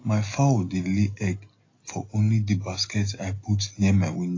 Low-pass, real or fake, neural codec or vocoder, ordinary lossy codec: 7.2 kHz; real; none; AAC, 32 kbps